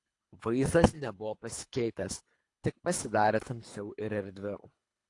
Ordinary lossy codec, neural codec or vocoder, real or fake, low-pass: AAC, 48 kbps; codec, 24 kHz, 3 kbps, HILCodec; fake; 10.8 kHz